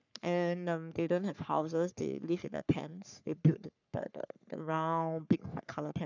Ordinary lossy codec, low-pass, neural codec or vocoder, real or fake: none; 7.2 kHz; codec, 44.1 kHz, 3.4 kbps, Pupu-Codec; fake